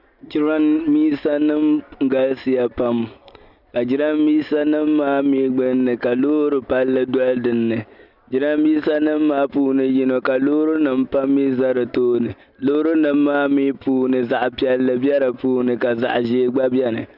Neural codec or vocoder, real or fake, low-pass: none; real; 5.4 kHz